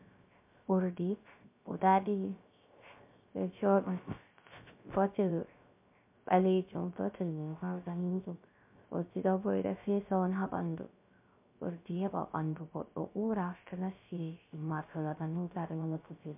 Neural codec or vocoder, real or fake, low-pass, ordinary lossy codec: codec, 16 kHz, 0.3 kbps, FocalCodec; fake; 3.6 kHz; MP3, 32 kbps